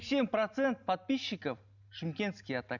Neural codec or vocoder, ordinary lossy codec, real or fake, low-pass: none; none; real; 7.2 kHz